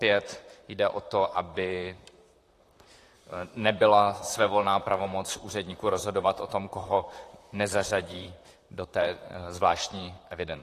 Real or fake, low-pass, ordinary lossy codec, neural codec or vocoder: fake; 14.4 kHz; AAC, 48 kbps; vocoder, 44.1 kHz, 128 mel bands, Pupu-Vocoder